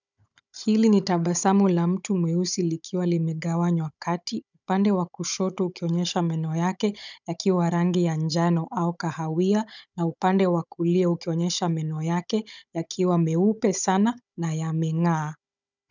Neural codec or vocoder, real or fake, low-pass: codec, 16 kHz, 16 kbps, FunCodec, trained on Chinese and English, 50 frames a second; fake; 7.2 kHz